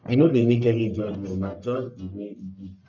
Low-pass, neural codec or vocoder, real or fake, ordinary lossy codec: 7.2 kHz; codec, 44.1 kHz, 1.7 kbps, Pupu-Codec; fake; none